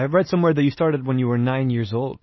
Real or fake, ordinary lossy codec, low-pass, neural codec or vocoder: real; MP3, 24 kbps; 7.2 kHz; none